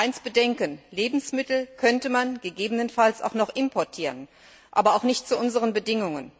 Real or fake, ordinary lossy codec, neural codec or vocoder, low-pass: real; none; none; none